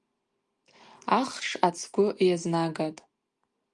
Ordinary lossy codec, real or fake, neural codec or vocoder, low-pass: Opus, 32 kbps; real; none; 10.8 kHz